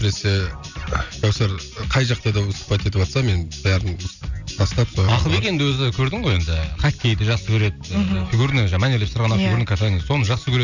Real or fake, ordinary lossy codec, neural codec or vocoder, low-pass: real; none; none; 7.2 kHz